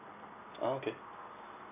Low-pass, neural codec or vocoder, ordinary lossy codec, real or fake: 3.6 kHz; none; none; real